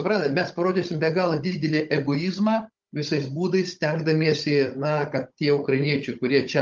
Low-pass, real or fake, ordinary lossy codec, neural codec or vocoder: 7.2 kHz; fake; Opus, 24 kbps; codec, 16 kHz, 16 kbps, FunCodec, trained on Chinese and English, 50 frames a second